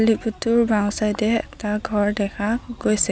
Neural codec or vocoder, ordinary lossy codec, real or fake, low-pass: none; none; real; none